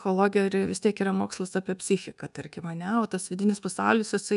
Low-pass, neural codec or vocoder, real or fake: 10.8 kHz; codec, 24 kHz, 1.2 kbps, DualCodec; fake